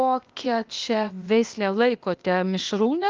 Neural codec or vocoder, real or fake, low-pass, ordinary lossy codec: codec, 16 kHz, 0.8 kbps, ZipCodec; fake; 7.2 kHz; Opus, 24 kbps